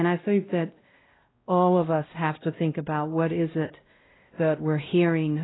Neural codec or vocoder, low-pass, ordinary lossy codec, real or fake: codec, 16 kHz, 0.5 kbps, X-Codec, WavLM features, trained on Multilingual LibriSpeech; 7.2 kHz; AAC, 16 kbps; fake